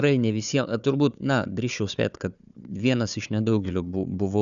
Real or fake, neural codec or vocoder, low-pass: fake; codec, 16 kHz, 4 kbps, FunCodec, trained on Chinese and English, 50 frames a second; 7.2 kHz